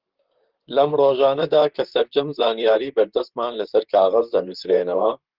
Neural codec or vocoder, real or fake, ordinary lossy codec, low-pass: vocoder, 44.1 kHz, 128 mel bands, Pupu-Vocoder; fake; Opus, 16 kbps; 5.4 kHz